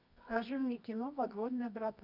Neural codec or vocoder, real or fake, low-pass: codec, 24 kHz, 0.9 kbps, WavTokenizer, medium music audio release; fake; 5.4 kHz